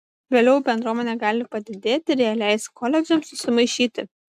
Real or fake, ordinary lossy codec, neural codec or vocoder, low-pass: real; AAC, 96 kbps; none; 14.4 kHz